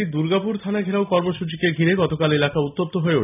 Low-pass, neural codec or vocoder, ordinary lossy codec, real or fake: 3.6 kHz; none; none; real